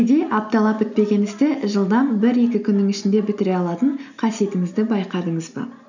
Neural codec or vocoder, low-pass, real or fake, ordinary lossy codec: none; 7.2 kHz; real; none